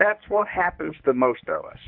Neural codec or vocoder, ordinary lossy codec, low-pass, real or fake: codec, 24 kHz, 0.9 kbps, WavTokenizer, medium speech release version 1; AAC, 48 kbps; 5.4 kHz; fake